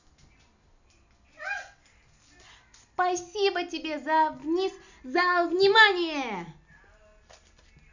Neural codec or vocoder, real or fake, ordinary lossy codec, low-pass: none; real; none; 7.2 kHz